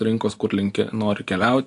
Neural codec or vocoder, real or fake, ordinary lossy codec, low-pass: none; real; AAC, 48 kbps; 10.8 kHz